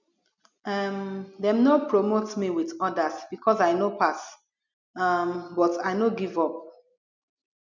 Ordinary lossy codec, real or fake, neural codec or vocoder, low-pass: none; real; none; 7.2 kHz